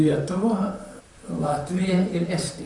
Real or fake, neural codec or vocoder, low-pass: fake; vocoder, 44.1 kHz, 128 mel bands, Pupu-Vocoder; 10.8 kHz